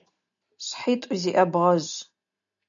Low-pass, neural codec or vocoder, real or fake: 7.2 kHz; none; real